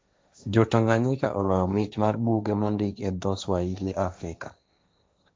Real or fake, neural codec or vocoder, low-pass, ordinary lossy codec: fake; codec, 16 kHz, 1.1 kbps, Voila-Tokenizer; none; none